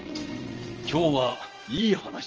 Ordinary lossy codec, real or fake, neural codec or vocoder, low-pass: Opus, 24 kbps; fake; vocoder, 22.05 kHz, 80 mel bands, WaveNeXt; 7.2 kHz